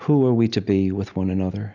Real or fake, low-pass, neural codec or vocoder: real; 7.2 kHz; none